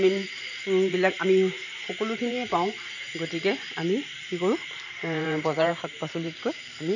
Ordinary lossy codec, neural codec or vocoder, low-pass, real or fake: none; vocoder, 44.1 kHz, 128 mel bands every 512 samples, BigVGAN v2; 7.2 kHz; fake